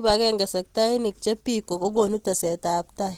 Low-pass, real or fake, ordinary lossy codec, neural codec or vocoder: 19.8 kHz; real; Opus, 16 kbps; none